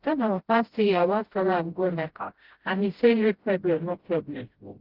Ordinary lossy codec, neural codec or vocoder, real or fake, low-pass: Opus, 16 kbps; codec, 16 kHz, 0.5 kbps, FreqCodec, smaller model; fake; 5.4 kHz